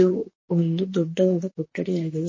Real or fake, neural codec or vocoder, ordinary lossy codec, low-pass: fake; vocoder, 44.1 kHz, 128 mel bands, Pupu-Vocoder; MP3, 32 kbps; 7.2 kHz